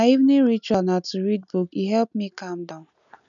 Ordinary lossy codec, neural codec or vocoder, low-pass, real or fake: none; none; 7.2 kHz; real